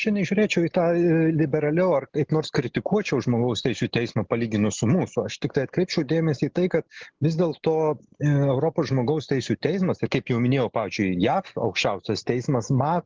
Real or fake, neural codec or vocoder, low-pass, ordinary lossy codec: real; none; 7.2 kHz; Opus, 16 kbps